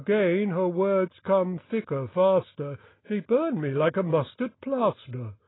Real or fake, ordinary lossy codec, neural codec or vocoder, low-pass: real; AAC, 16 kbps; none; 7.2 kHz